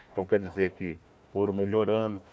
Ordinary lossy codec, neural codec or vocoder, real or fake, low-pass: none; codec, 16 kHz, 1 kbps, FunCodec, trained on Chinese and English, 50 frames a second; fake; none